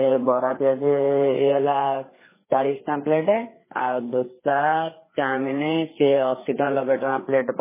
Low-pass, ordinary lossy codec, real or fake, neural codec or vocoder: 3.6 kHz; MP3, 16 kbps; fake; codec, 16 kHz, 4 kbps, FreqCodec, larger model